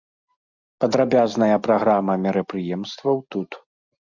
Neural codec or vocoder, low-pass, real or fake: none; 7.2 kHz; real